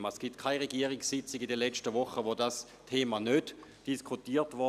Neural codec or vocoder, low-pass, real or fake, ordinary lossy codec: none; 14.4 kHz; real; none